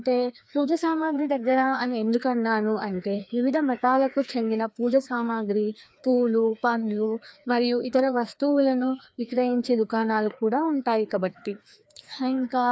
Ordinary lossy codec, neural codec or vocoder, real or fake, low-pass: none; codec, 16 kHz, 2 kbps, FreqCodec, larger model; fake; none